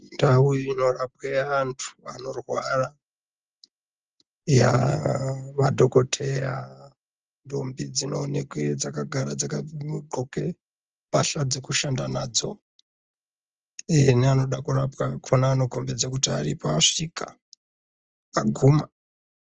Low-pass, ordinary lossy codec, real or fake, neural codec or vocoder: 7.2 kHz; Opus, 24 kbps; real; none